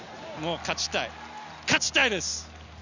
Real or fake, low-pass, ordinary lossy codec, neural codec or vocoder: real; 7.2 kHz; none; none